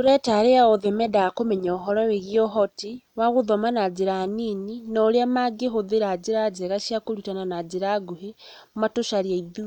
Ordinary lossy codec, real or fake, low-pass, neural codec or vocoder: none; real; 19.8 kHz; none